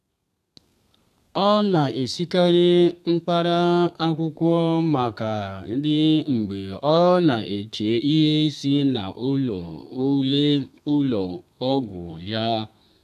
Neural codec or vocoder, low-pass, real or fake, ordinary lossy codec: codec, 32 kHz, 1.9 kbps, SNAC; 14.4 kHz; fake; none